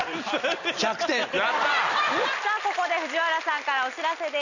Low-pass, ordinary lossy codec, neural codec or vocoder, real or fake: 7.2 kHz; none; none; real